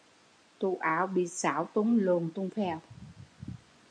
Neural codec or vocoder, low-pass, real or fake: vocoder, 24 kHz, 100 mel bands, Vocos; 9.9 kHz; fake